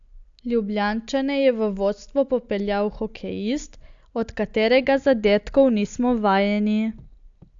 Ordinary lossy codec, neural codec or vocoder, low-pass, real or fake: AAC, 64 kbps; none; 7.2 kHz; real